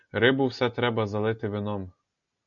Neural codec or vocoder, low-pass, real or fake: none; 7.2 kHz; real